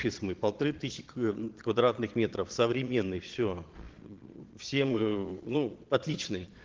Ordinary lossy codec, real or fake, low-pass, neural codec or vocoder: Opus, 16 kbps; fake; 7.2 kHz; vocoder, 22.05 kHz, 80 mel bands, Vocos